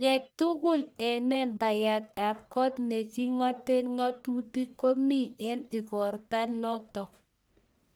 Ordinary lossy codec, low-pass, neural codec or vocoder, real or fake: none; none; codec, 44.1 kHz, 1.7 kbps, Pupu-Codec; fake